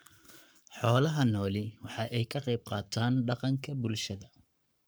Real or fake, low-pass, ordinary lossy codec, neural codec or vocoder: fake; none; none; codec, 44.1 kHz, 7.8 kbps, Pupu-Codec